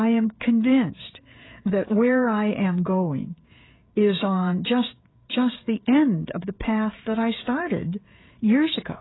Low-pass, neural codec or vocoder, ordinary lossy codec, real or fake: 7.2 kHz; codec, 16 kHz, 16 kbps, FreqCodec, smaller model; AAC, 16 kbps; fake